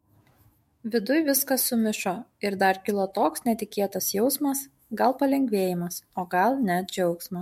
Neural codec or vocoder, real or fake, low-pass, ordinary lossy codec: autoencoder, 48 kHz, 128 numbers a frame, DAC-VAE, trained on Japanese speech; fake; 19.8 kHz; MP3, 64 kbps